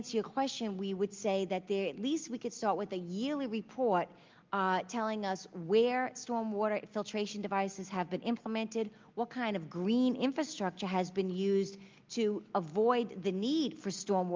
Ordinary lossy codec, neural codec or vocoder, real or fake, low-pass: Opus, 32 kbps; none; real; 7.2 kHz